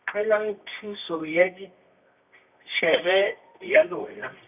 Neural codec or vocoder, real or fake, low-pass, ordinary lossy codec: codec, 24 kHz, 0.9 kbps, WavTokenizer, medium music audio release; fake; 3.6 kHz; none